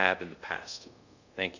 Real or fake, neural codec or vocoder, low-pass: fake; codec, 24 kHz, 0.5 kbps, DualCodec; 7.2 kHz